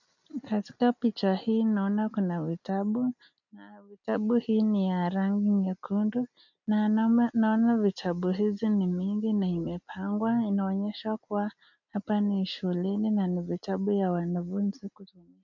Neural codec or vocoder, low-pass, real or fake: none; 7.2 kHz; real